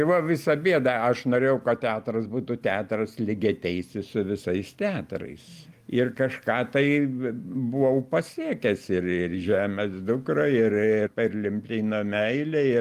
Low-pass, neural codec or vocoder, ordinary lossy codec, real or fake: 14.4 kHz; none; Opus, 32 kbps; real